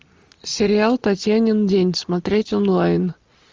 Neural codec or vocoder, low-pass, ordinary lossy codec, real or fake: none; 7.2 kHz; Opus, 24 kbps; real